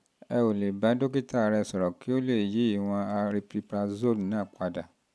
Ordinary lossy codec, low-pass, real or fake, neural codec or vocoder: none; none; real; none